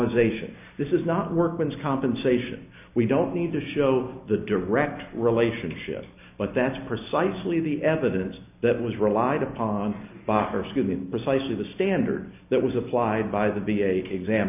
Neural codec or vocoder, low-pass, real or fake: none; 3.6 kHz; real